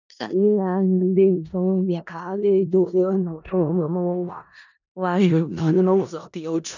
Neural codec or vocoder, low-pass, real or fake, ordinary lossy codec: codec, 16 kHz in and 24 kHz out, 0.4 kbps, LongCat-Audio-Codec, four codebook decoder; 7.2 kHz; fake; none